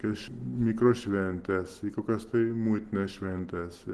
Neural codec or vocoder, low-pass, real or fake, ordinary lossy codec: none; 10.8 kHz; real; Opus, 16 kbps